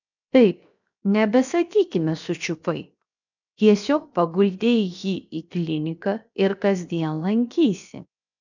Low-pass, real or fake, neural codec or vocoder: 7.2 kHz; fake; codec, 16 kHz, 0.7 kbps, FocalCodec